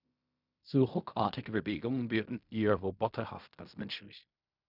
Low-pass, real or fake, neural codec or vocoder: 5.4 kHz; fake; codec, 16 kHz in and 24 kHz out, 0.4 kbps, LongCat-Audio-Codec, fine tuned four codebook decoder